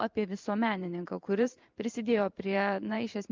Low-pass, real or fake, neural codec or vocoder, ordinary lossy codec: 7.2 kHz; fake; vocoder, 44.1 kHz, 128 mel bands every 512 samples, BigVGAN v2; Opus, 24 kbps